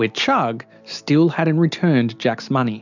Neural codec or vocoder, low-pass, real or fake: none; 7.2 kHz; real